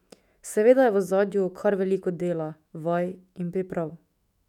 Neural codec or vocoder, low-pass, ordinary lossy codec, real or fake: autoencoder, 48 kHz, 128 numbers a frame, DAC-VAE, trained on Japanese speech; 19.8 kHz; none; fake